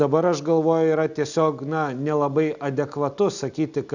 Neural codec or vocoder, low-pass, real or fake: none; 7.2 kHz; real